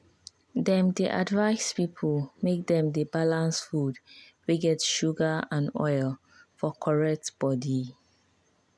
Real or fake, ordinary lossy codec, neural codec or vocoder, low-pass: real; none; none; none